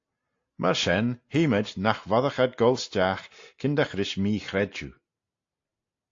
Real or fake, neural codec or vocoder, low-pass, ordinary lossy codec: real; none; 7.2 kHz; AAC, 48 kbps